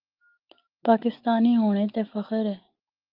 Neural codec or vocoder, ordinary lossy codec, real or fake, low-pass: none; Opus, 32 kbps; real; 5.4 kHz